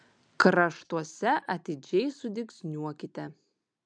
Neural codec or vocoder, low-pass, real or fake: none; 9.9 kHz; real